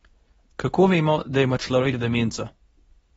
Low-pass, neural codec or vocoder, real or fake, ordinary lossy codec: 10.8 kHz; codec, 24 kHz, 0.9 kbps, WavTokenizer, medium speech release version 2; fake; AAC, 24 kbps